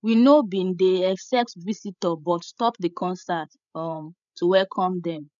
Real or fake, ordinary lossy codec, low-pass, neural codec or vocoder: fake; none; 7.2 kHz; codec, 16 kHz, 16 kbps, FreqCodec, larger model